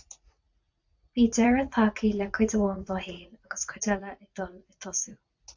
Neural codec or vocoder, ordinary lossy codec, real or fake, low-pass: vocoder, 44.1 kHz, 80 mel bands, Vocos; AAC, 48 kbps; fake; 7.2 kHz